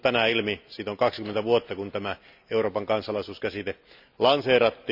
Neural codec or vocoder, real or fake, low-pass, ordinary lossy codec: none; real; 5.4 kHz; none